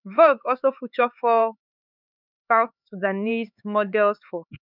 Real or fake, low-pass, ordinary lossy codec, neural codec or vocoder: fake; 5.4 kHz; none; codec, 16 kHz, 4 kbps, X-Codec, HuBERT features, trained on LibriSpeech